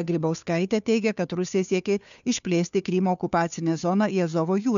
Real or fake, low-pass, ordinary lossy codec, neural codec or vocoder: fake; 7.2 kHz; MP3, 96 kbps; codec, 16 kHz, 2 kbps, FunCodec, trained on Chinese and English, 25 frames a second